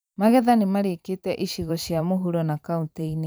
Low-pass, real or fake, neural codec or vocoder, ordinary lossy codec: none; fake; vocoder, 44.1 kHz, 128 mel bands every 512 samples, BigVGAN v2; none